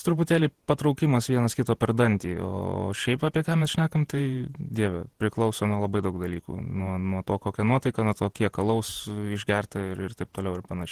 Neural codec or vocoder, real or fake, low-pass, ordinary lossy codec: vocoder, 48 kHz, 128 mel bands, Vocos; fake; 14.4 kHz; Opus, 16 kbps